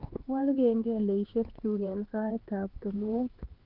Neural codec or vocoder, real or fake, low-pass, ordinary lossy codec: codec, 16 kHz, 2 kbps, X-Codec, HuBERT features, trained on LibriSpeech; fake; 5.4 kHz; Opus, 16 kbps